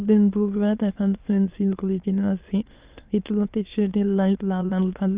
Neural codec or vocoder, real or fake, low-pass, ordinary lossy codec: autoencoder, 22.05 kHz, a latent of 192 numbers a frame, VITS, trained on many speakers; fake; 3.6 kHz; Opus, 24 kbps